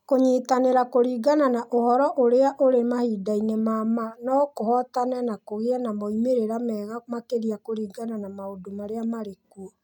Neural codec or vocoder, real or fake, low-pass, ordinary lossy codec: none; real; 19.8 kHz; none